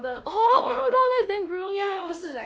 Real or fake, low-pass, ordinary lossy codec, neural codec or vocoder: fake; none; none; codec, 16 kHz, 1 kbps, X-Codec, WavLM features, trained on Multilingual LibriSpeech